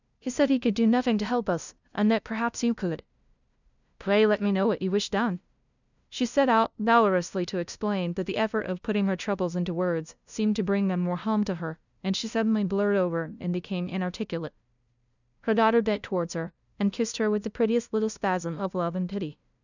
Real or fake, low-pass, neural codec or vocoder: fake; 7.2 kHz; codec, 16 kHz, 0.5 kbps, FunCodec, trained on LibriTTS, 25 frames a second